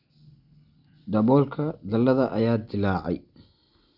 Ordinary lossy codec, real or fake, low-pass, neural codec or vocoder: none; real; 5.4 kHz; none